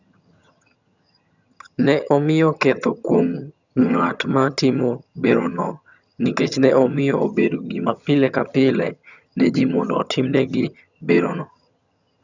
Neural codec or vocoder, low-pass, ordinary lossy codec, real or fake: vocoder, 22.05 kHz, 80 mel bands, HiFi-GAN; 7.2 kHz; none; fake